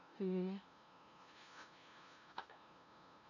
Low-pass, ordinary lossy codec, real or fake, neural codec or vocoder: 7.2 kHz; none; fake; codec, 16 kHz, 0.5 kbps, FunCodec, trained on LibriTTS, 25 frames a second